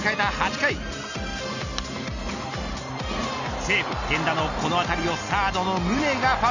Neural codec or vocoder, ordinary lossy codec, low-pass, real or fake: none; none; 7.2 kHz; real